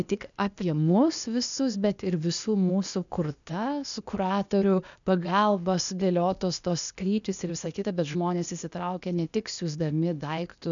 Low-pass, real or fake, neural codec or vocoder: 7.2 kHz; fake; codec, 16 kHz, 0.8 kbps, ZipCodec